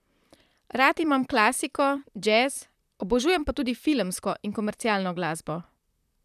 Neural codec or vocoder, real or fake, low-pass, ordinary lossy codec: vocoder, 44.1 kHz, 128 mel bands, Pupu-Vocoder; fake; 14.4 kHz; none